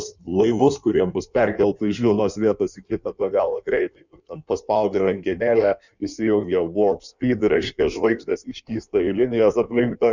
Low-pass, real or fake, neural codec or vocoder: 7.2 kHz; fake; codec, 16 kHz in and 24 kHz out, 1.1 kbps, FireRedTTS-2 codec